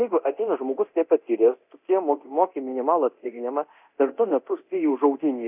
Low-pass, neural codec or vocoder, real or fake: 3.6 kHz; codec, 24 kHz, 0.9 kbps, DualCodec; fake